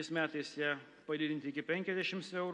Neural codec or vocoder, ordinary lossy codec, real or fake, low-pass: none; MP3, 48 kbps; real; 10.8 kHz